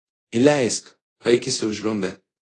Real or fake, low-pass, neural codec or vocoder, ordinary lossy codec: fake; 10.8 kHz; codec, 24 kHz, 0.5 kbps, DualCodec; AAC, 32 kbps